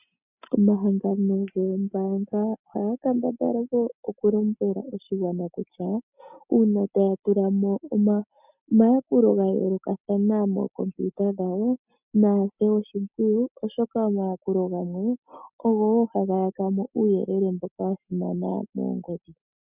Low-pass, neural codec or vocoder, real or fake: 3.6 kHz; none; real